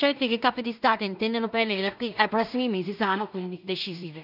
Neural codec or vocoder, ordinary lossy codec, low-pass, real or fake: codec, 16 kHz in and 24 kHz out, 0.4 kbps, LongCat-Audio-Codec, two codebook decoder; none; 5.4 kHz; fake